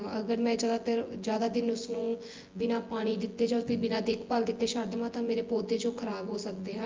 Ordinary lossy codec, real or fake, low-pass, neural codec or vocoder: Opus, 32 kbps; fake; 7.2 kHz; vocoder, 24 kHz, 100 mel bands, Vocos